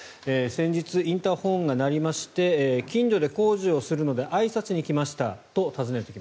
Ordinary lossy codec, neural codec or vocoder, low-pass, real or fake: none; none; none; real